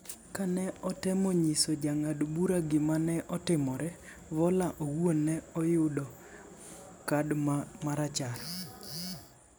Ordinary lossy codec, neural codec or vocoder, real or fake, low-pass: none; none; real; none